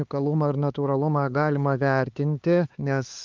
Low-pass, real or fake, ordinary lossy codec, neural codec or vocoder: 7.2 kHz; fake; Opus, 32 kbps; codec, 16 kHz, 4 kbps, X-Codec, HuBERT features, trained on LibriSpeech